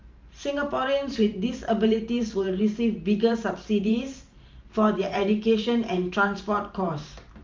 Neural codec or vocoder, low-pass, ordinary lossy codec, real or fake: vocoder, 44.1 kHz, 128 mel bands every 512 samples, BigVGAN v2; 7.2 kHz; Opus, 24 kbps; fake